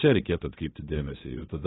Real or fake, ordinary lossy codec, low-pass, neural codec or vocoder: fake; AAC, 16 kbps; 7.2 kHz; codec, 16 kHz, 0.3 kbps, FocalCodec